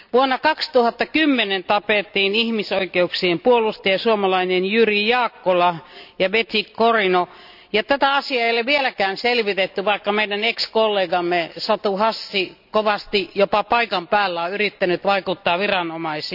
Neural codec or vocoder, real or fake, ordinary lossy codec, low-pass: none; real; none; 5.4 kHz